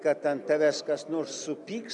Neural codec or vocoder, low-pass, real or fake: none; 10.8 kHz; real